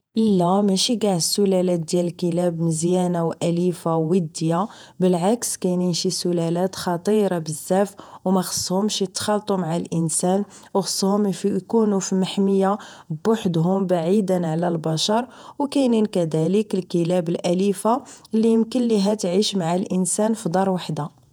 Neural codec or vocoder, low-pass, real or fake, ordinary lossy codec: vocoder, 48 kHz, 128 mel bands, Vocos; none; fake; none